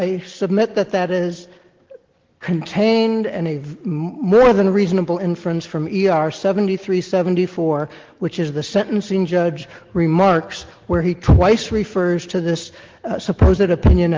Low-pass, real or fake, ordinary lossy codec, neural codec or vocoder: 7.2 kHz; real; Opus, 16 kbps; none